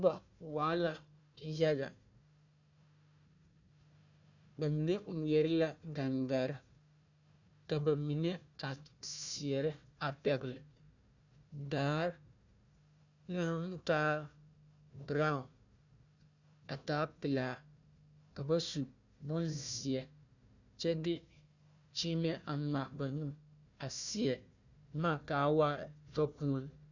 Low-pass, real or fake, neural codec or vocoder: 7.2 kHz; fake; codec, 16 kHz, 1 kbps, FunCodec, trained on Chinese and English, 50 frames a second